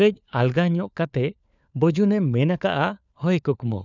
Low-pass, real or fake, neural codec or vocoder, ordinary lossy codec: 7.2 kHz; fake; codec, 16 kHz, 4 kbps, FunCodec, trained on LibriTTS, 50 frames a second; none